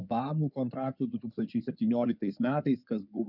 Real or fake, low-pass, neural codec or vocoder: fake; 5.4 kHz; codec, 16 kHz in and 24 kHz out, 2.2 kbps, FireRedTTS-2 codec